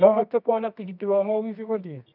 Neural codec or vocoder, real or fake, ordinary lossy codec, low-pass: codec, 24 kHz, 0.9 kbps, WavTokenizer, medium music audio release; fake; none; 5.4 kHz